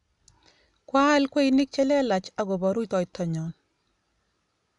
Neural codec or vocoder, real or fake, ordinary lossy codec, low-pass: none; real; none; 10.8 kHz